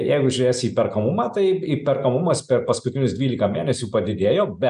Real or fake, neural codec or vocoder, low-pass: real; none; 10.8 kHz